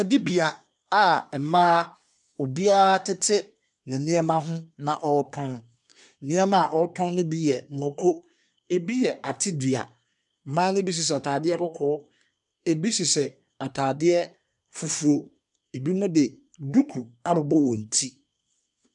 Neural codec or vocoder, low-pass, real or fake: codec, 24 kHz, 1 kbps, SNAC; 10.8 kHz; fake